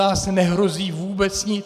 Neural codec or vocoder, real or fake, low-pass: none; real; 14.4 kHz